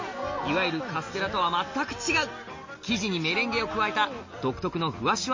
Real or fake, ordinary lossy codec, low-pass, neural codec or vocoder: real; MP3, 32 kbps; 7.2 kHz; none